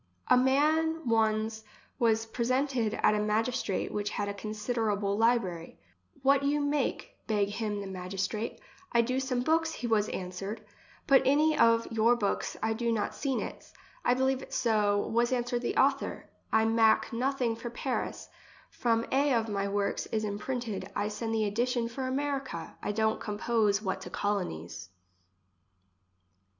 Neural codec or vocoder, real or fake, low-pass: none; real; 7.2 kHz